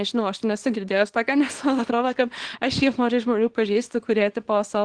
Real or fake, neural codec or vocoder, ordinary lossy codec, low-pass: fake; codec, 24 kHz, 0.9 kbps, WavTokenizer, small release; Opus, 16 kbps; 9.9 kHz